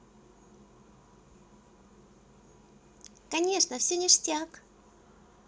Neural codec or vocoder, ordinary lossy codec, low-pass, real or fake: none; none; none; real